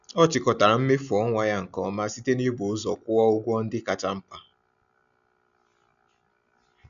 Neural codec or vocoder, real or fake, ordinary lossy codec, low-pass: none; real; none; 7.2 kHz